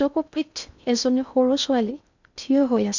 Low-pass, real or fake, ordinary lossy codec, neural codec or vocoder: 7.2 kHz; fake; none; codec, 16 kHz in and 24 kHz out, 0.6 kbps, FocalCodec, streaming, 2048 codes